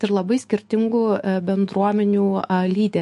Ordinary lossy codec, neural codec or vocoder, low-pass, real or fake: MP3, 48 kbps; autoencoder, 48 kHz, 128 numbers a frame, DAC-VAE, trained on Japanese speech; 14.4 kHz; fake